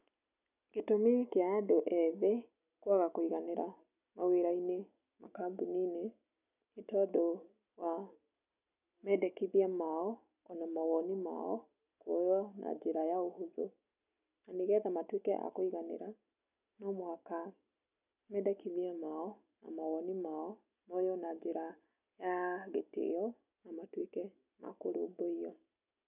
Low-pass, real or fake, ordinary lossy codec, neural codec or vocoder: 3.6 kHz; real; none; none